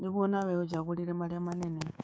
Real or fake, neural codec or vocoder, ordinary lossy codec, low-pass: fake; codec, 16 kHz, 6 kbps, DAC; none; none